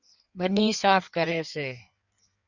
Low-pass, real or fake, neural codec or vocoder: 7.2 kHz; fake; codec, 16 kHz in and 24 kHz out, 1.1 kbps, FireRedTTS-2 codec